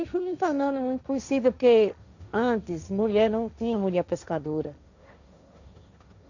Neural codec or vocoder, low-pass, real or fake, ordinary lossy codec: codec, 16 kHz, 1.1 kbps, Voila-Tokenizer; 7.2 kHz; fake; none